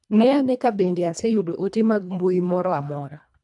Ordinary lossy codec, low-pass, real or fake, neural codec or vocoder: none; 10.8 kHz; fake; codec, 24 kHz, 1.5 kbps, HILCodec